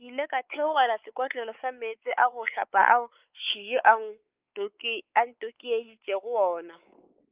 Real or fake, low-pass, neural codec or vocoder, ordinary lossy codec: fake; 3.6 kHz; codec, 16 kHz, 4 kbps, X-Codec, WavLM features, trained on Multilingual LibriSpeech; Opus, 24 kbps